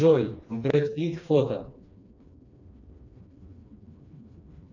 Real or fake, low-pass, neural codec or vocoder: fake; 7.2 kHz; codec, 16 kHz, 2 kbps, FreqCodec, smaller model